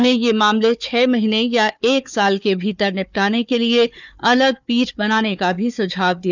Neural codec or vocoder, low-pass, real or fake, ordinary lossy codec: codec, 16 kHz, 4 kbps, FunCodec, trained on Chinese and English, 50 frames a second; 7.2 kHz; fake; none